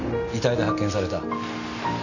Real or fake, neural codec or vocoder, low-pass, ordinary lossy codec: real; none; 7.2 kHz; none